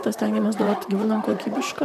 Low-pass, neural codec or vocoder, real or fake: 14.4 kHz; vocoder, 44.1 kHz, 128 mel bands, Pupu-Vocoder; fake